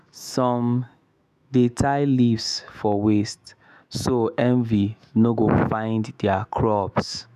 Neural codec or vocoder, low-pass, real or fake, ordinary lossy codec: autoencoder, 48 kHz, 128 numbers a frame, DAC-VAE, trained on Japanese speech; 14.4 kHz; fake; none